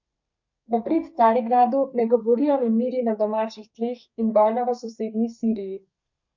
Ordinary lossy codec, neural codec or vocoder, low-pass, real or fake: MP3, 48 kbps; codec, 32 kHz, 1.9 kbps, SNAC; 7.2 kHz; fake